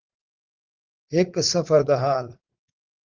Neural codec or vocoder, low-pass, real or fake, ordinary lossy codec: vocoder, 44.1 kHz, 80 mel bands, Vocos; 7.2 kHz; fake; Opus, 16 kbps